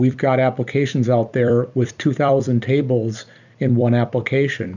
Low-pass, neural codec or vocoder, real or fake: 7.2 kHz; vocoder, 44.1 kHz, 128 mel bands every 256 samples, BigVGAN v2; fake